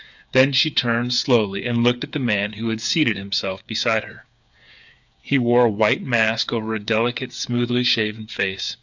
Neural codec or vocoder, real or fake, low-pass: codec, 16 kHz, 8 kbps, FreqCodec, smaller model; fake; 7.2 kHz